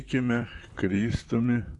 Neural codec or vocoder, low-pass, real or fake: vocoder, 44.1 kHz, 128 mel bands, Pupu-Vocoder; 10.8 kHz; fake